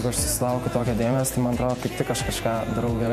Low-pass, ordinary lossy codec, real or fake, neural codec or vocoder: 14.4 kHz; MP3, 64 kbps; fake; vocoder, 48 kHz, 128 mel bands, Vocos